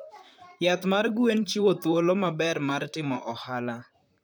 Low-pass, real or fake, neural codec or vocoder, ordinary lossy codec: none; fake; vocoder, 44.1 kHz, 128 mel bands, Pupu-Vocoder; none